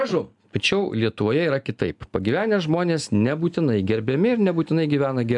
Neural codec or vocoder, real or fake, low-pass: none; real; 10.8 kHz